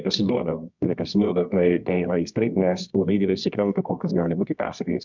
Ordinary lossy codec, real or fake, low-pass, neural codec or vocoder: MP3, 64 kbps; fake; 7.2 kHz; codec, 24 kHz, 0.9 kbps, WavTokenizer, medium music audio release